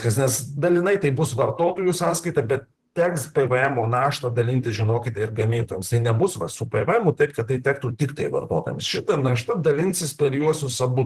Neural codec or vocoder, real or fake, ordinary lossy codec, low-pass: vocoder, 44.1 kHz, 128 mel bands, Pupu-Vocoder; fake; Opus, 16 kbps; 14.4 kHz